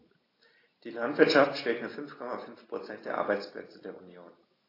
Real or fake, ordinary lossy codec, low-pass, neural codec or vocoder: real; AAC, 24 kbps; 5.4 kHz; none